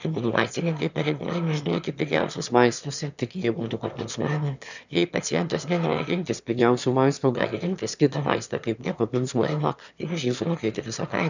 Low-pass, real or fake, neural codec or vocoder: 7.2 kHz; fake; autoencoder, 22.05 kHz, a latent of 192 numbers a frame, VITS, trained on one speaker